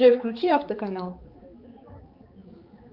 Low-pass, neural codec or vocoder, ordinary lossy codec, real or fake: 5.4 kHz; codec, 16 kHz, 4 kbps, X-Codec, HuBERT features, trained on balanced general audio; Opus, 32 kbps; fake